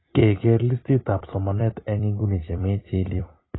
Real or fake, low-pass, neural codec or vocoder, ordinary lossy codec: fake; 7.2 kHz; vocoder, 44.1 kHz, 128 mel bands every 256 samples, BigVGAN v2; AAC, 16 kbps